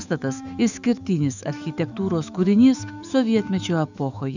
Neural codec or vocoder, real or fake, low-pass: autoencoder, 48 kHz, 128 numbers a frame, DAC-VAE, trained on Japanese speech; fake; 7.2 kHz